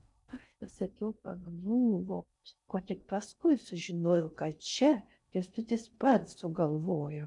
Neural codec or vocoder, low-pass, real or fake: codec, 16 kHz in and 24 kHz out, 0.6 kbps, FocalCodec, streaming, 4096 codes; 10.8 kHz; fake